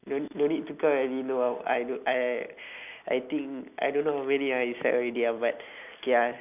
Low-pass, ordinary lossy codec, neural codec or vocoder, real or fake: 3.6 kHz; none; none; real